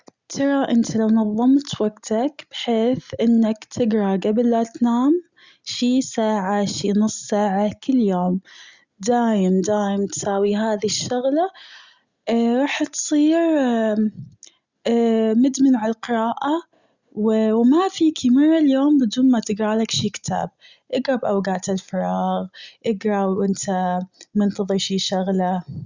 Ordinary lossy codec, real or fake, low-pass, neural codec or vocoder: Opus, 64 kbps; real; 7.2 kHz; none